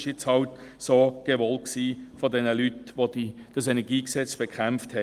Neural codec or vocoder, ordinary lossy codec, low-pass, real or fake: none; Opus, 32 kbps; 14.4 kHz; real